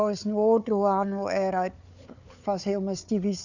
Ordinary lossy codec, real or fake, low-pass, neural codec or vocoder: none; fake; 7.2 kHz; codec, 16 kHz, 4 kbps, FunCodec, trained on Chinese and English, 50 frames a second